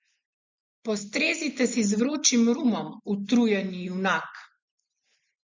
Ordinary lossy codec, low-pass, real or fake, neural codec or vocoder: MP3, 64 kbps; 7.2 kHz; real; none